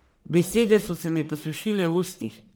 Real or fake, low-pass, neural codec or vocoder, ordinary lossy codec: fake; none; codec, 44.1 kHz, 1.7 kbps, Pupu-Codec; none